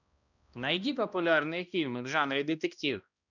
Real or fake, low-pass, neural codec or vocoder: fake; 7.2 kHz; codec, 16 kHz, 1 kbps, X-Codec, HuBERT features, trained on balanced general audio